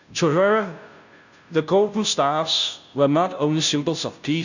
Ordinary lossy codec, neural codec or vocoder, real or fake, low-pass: none; codec, 16 kHz, 0.5 kbps, FunCodec, trained on Chinese and English, 25 frames a second; fake; 7.2 kHz